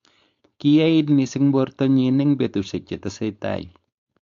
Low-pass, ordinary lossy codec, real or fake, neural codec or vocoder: 7.2 kHz; AAC, 64 kbps; fake; codec, 16 kHz, 4.8 kbps, FACodec